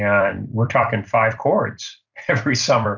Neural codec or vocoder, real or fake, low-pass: vocoder, 44.1 kHz, 128 mel bands every 256 samples, BigVGAN v2; fake; 7.2 kHz